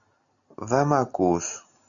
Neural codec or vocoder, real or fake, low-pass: none; real; 7.2 kHz